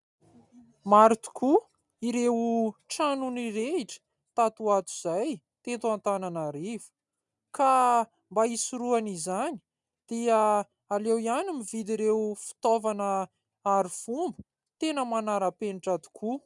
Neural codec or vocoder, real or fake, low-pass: none; real; 10.8 kHz